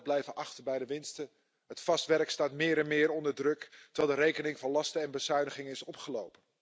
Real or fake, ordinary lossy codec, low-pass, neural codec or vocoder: real; none; none; none